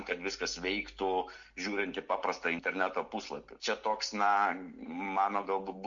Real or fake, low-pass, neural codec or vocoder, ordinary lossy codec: real; 7.2 kHz; none; MP3, 48 kbps